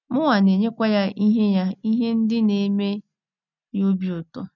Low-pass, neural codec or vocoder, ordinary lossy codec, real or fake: 7.2 kHz; none; none; real